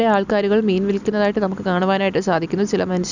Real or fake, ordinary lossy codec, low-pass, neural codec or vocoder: real; none; 7.2 kHz; none